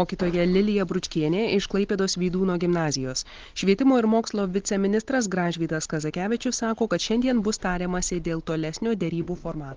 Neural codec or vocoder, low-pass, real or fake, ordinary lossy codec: none; 7.2 kHz; real; Opus, 16 kbps